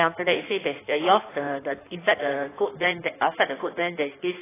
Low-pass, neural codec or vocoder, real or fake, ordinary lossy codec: 3.6 kHz; codec, 16 kHz in and 24 kHz out, 1.1 kbps, FireRedTTS-2 codec; fake; AAC, 16 kbps